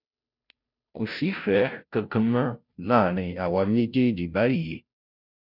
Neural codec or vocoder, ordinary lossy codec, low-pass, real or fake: codec, 16 kHz, 0.5 kbps, FunCodec, trained on Chinese and English, 25 frames a second; none; 5.4 kHz; fake